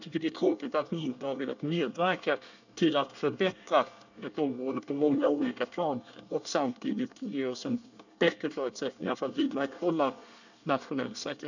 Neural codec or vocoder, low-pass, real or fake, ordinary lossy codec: codec, 24 kHz, 1 kbps, SNAC; 7.2 kHz; fake; none